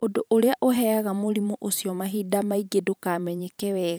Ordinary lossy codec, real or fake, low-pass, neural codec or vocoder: none; fake; none; vocoder, 44.1 kHz, 128 mel bands every 512 samples, BigVGAN v2